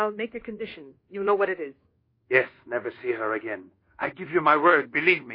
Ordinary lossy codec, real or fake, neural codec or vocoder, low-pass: MP3, 24 kbps; fake; codec, 24 kHz, 1.2 kbps, DualCodec; 5.4 kHz